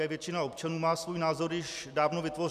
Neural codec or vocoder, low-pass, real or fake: none; 14.4 kHz; real